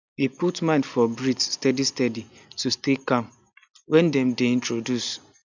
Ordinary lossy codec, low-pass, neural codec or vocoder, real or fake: none; 7.2 kHz; none; real